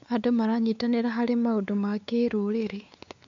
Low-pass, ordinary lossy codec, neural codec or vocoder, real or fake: 7.2 kHz; MP3, 64 kbps; codec, 16 kHz, 4 kbps, X-Codec, WavLM features, trained on Multilingual LibriSpeech; fake